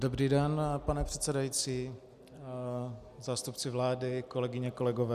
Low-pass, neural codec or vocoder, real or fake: 14.4 kHz; none; real